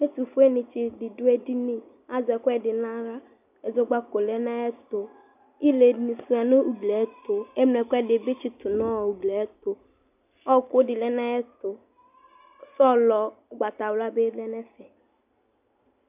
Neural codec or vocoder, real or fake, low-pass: none; real; 3.6 kHz